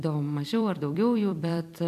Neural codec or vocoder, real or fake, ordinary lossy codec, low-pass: vocoder, 44.1 kHz, 128 mel bands every 256 samples, BigVGAN v2; fake; MP3, 96 kbps; 14.4 kHz